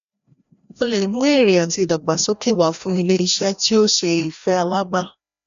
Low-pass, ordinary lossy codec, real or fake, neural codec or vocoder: 7.2 kHz; MP3, 64 kbps; fake; codec, 16 kHz, 1 kbps, FreqCodec, larger model